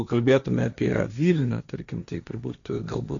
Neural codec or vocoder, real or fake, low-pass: codec, 16 kHz, 1.1 kbps, Voila-Tokenizer; fake; 7.2 kHz